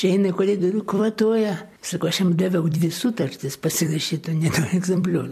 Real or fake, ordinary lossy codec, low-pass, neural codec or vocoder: fake; MP3, 64 kbps; 14.4 kHz; vocoder, 44.1 kHz, 128 mel bands every 256 samples, BigVGAN v2